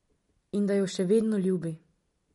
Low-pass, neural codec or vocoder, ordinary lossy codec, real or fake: 19.8 kHz; vocoder, 44.1 kHz, 128 mel bands every 256 samples, BigVGAN v2; MP3, 48 kbps; fake